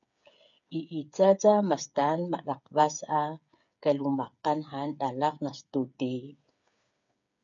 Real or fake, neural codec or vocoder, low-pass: fake; codec, 16 kHz, 8 kbps, FreqCodec, smaller model; 7.2 kHz